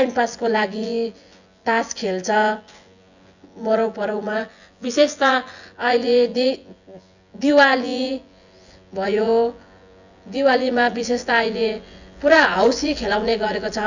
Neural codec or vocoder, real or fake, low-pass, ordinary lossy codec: vocoder, 24 kHz, 100 mel bands, Vocos; fake; 7.2 kHz; none